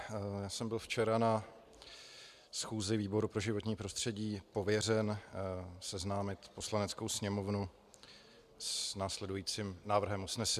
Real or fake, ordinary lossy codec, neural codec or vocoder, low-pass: real; MP3, 96 kbps; none; 14.4 kHz